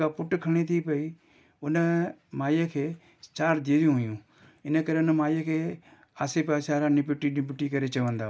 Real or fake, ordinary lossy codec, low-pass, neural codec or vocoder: real; none; none; none